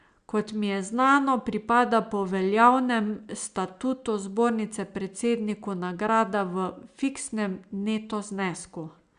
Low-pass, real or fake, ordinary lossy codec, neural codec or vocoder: 9.9 kHz; real; Opus, 64 kbps; none